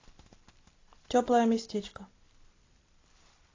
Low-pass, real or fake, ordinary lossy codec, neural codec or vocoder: 7.2 kHz; real; AAC, 32 kbps; none